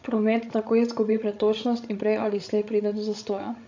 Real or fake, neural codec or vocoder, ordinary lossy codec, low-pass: fake; codec, 16 kHz, 16 kbps, FreqCodec, larger model; AAC, 48 kbps; 7.2 kHz